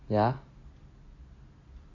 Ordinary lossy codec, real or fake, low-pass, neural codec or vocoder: AAC, 32 kbps; real; 7.2 kHz; none